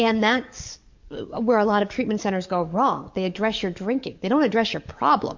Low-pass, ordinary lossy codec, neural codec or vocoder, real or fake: 7.2 kHz; MP3, 64 kbps; codec, 44.1 kHz, 7.8 kbps, DAC; fake